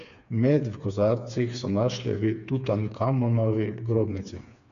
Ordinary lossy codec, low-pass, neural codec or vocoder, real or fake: none; 7.2 kHz; codec, 16 kHz, 4 kbps, FreqCodec, smaller model; fake